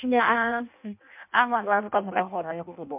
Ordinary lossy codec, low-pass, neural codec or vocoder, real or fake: MP3, 32 kbps; 3.6 kHz; codec, 16 kHz in and 24 kHz out, 0.6 kbps, FireRedTTS-2 codec; fake